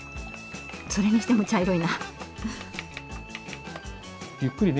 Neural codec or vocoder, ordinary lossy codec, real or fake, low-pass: none; none; real; none